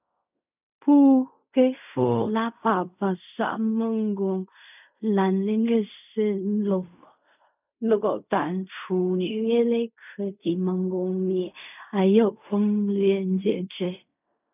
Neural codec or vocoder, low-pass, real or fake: codec, 16 kHz in and 24 kHz out, 0.4 kbps, LongCat-Audio-Codec, fine tuned four codebook decoder; 3.6 kHz; fake